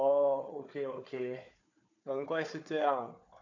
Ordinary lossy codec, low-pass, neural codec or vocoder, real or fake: none; 7.2 kHz; codec, 16 kHz, 4 kbps, FunCodec, trained on Chinese and English, 50 frames a second; fake